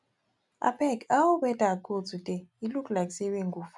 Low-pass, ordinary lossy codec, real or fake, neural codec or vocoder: 10.8 kHz; none; real; none